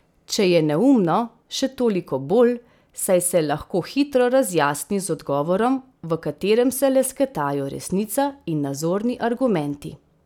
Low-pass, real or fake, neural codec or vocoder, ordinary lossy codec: 19.8 kHz; real; none; none